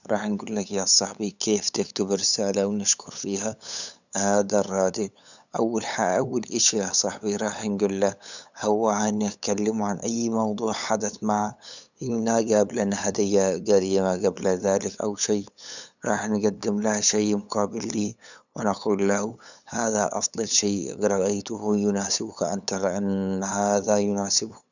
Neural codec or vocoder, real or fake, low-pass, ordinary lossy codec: codec, 16 kHz, 8 kbps, FunCodec, trained on LibriTTS, 25 frames a second; fake; 7.2 kHz; none